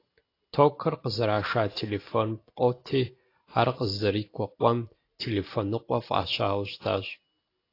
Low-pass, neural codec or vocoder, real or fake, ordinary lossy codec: 5.4 kHz; none; real; AAC, 32 kbps